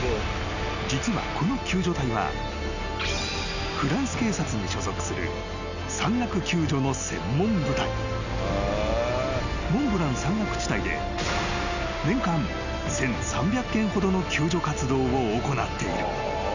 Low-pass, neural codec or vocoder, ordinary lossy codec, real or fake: 7.2 kHz; none; none; real